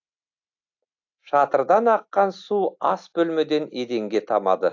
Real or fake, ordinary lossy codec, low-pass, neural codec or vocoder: real; AAC, 48 kbps; 7.2 kHz; none